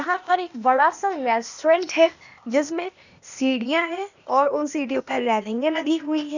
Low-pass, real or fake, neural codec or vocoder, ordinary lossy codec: 7.2 kHz; fake; codec, 16 kHz, 0.8 kbps, ZipCodec; none